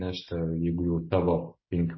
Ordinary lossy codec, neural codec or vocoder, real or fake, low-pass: MP3, 24 kbps; none; real; 7.2 kHz